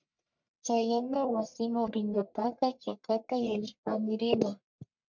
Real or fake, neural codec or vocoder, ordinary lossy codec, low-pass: fake; codec, 44.1 kHz, 1.7 kbps, Pupu-Codec; MP3, 64 kbps; 7.2 kHz